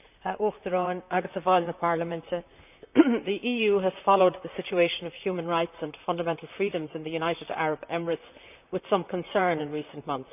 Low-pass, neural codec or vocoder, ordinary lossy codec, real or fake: 3.6 kHz; vocoder, 44.1 kHz, 128 mel bands, Pupu-Vocoder; none; fake